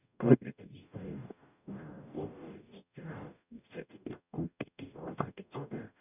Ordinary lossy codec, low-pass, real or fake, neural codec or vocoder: none; 3.6 kHz; fake; codec, 44.1 kHz, 0.9 kbps, DAC